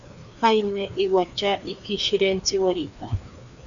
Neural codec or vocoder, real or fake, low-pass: codec, 16 kHz, 2 kbps, FreqCodec, larger model; fake; 7.2 kHz